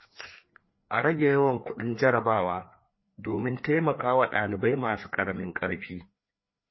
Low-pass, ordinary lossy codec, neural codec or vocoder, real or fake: 7.2 kHz; MP3, 24 kbps; codec, 16 kHz, 2 kbps, FreqCodec, larger model; fake